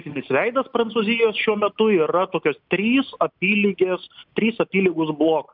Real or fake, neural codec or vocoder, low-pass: real; none; 5.4 kHz